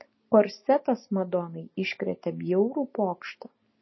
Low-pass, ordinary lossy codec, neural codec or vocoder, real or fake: 7.2 kHz; MP3, 24 kbps; none; real